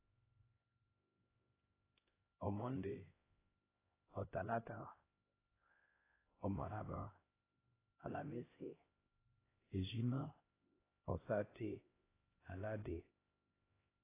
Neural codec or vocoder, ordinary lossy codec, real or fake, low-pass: codec, 16 kHz, 1 kbps, X-Codec, HuBERT features, trained on LibriSpeech; AAC, 16 kbps; fake; 3.6 kHz